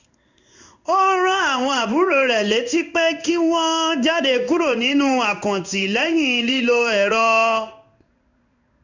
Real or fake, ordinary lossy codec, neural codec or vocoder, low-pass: fake; none; codec, 16 kHz in and 24 kHz out, 1 kbps, XY-Tokenizer; 7.2 kHz